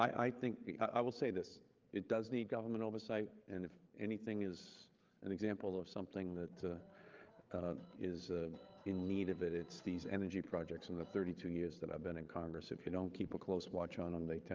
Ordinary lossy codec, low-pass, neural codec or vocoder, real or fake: Opus, 32 kbps; 7.2 kHz; codec, 16 kHz, 8 kbps, FreqCodec, larger model; fake